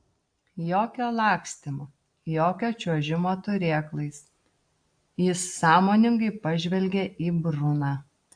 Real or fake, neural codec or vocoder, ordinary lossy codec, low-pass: real; none; AAC, 64 kbps; 9.9 kHz